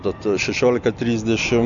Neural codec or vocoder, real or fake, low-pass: none; real; 7.2 kHz